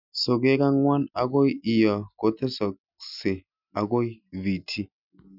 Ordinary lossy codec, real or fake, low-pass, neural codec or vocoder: none; real; 5.4 kHz; none